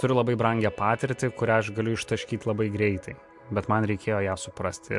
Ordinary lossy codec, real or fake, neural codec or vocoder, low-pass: MP3, 64 kbps; real; none; 10.8 kHz